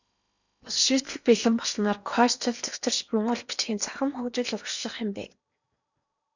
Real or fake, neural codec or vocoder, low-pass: fake; codec, 16 kHz in and 24 kHz out, 0.8 kbps, FocalCodec, streaming, 65536 codes; 7.2 kHz